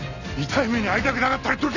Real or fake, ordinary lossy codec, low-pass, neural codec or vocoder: real; none; 7.2 kHz; none